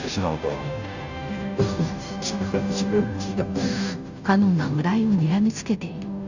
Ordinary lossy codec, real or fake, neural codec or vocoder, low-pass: none; fake; codec, 16 kHz, 0.5 kbps, FunCodec, trained on Chinese and English, 25 frames a second; 7.2 kHz